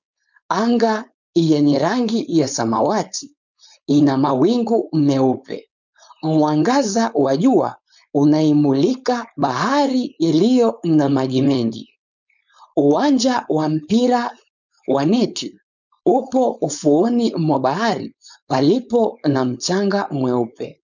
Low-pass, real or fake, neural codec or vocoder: 7.2 kHz; fake; codec, 16 kHz, 4.8 kbps, FACodec